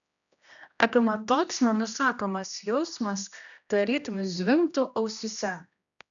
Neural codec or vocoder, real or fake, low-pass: codec, 16 kHz, 1 kbps, X-Codec, HuBERT features, trained on general audio; fake; 7.2 kHz